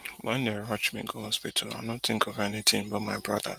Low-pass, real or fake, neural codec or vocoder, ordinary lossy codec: 14.4 kHz; real; none; Opus, 32 kbps